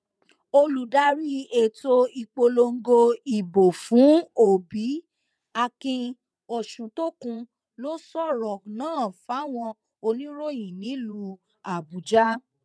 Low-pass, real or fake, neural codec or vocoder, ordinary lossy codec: none; fake; vocoder, 22.05 kHz, 80 mel bands, WaveNeXt; none